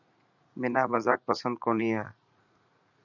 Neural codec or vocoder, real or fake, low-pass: vocoder, 44.1 kHz, 80 mel bands, Vocos; fake; 7.2 kHz